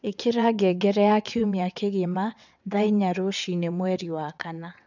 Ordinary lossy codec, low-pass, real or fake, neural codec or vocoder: none; 7.2 kHz; fake; vocoder, 22.05 kHz, 80 mel bands, WaveNeXt